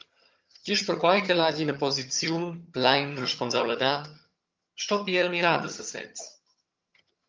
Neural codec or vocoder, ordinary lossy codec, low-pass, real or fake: vocoder, 22.05 kHz, 80 mel bands, HiFi-GAN; Opus, 24 kbps; 7.2 kHz; fake